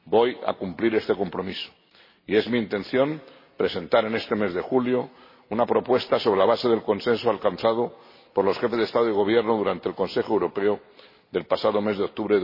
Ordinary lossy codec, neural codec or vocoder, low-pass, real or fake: MP3, 24 kbps; none; 5.4 kHz; real